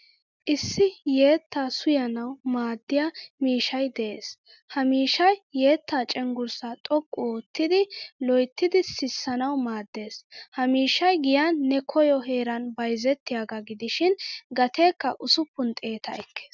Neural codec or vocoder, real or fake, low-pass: none; real; 7.2 kHz